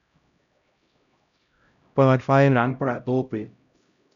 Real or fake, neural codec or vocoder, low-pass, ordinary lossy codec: fake; codec, 16 kHz, 0.5 kbps, X-Codec, HuBERT features, trained on LibriSpeech; 7.2 kHz; none